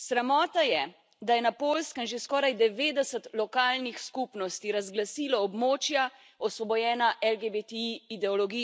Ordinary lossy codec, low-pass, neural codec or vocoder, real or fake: none; none; none; real